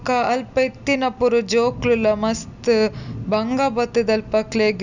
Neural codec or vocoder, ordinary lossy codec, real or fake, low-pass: none; none; real; 7.2 kHz